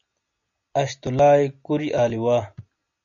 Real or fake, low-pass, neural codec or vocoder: real; 7.2 kHz; none